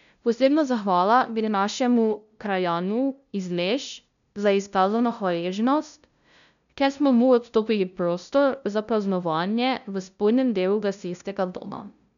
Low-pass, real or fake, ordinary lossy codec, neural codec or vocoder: 7.2 kHz; fake; none; codec, 16 kHz, 0.5 kbps, FunCodec, trained on LibriTTS, 25 frames a second